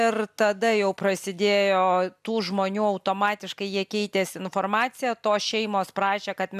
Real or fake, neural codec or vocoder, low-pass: real; none; 14.4 kHz